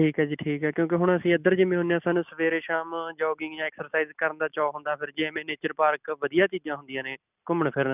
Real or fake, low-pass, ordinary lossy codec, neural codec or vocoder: real; 3.6 kHz; none; none